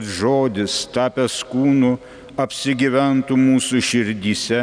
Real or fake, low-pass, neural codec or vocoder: real; 9.9 kHz; none